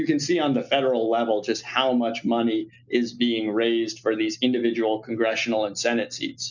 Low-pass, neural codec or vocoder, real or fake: 7.2 kHz; none; real